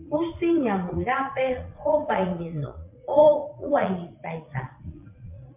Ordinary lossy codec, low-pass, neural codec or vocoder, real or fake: MP3, 32 kbps; 3.6 kHz; vocoder, 22.05 kHz, 80 mel bands, Vocos; fake